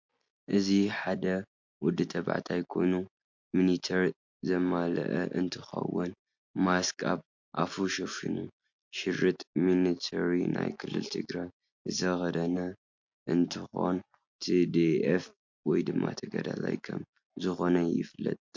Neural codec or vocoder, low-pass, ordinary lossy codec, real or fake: none; 7.2 kHz; MP3, 48 kbps; real